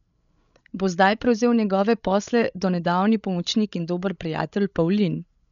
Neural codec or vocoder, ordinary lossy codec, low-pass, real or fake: codec, 16 kHz, 16 kbps, FreqCodec, larger model; none; 7.2 kHz; fake